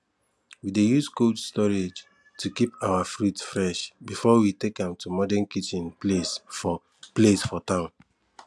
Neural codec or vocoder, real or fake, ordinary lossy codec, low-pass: none; real; none; none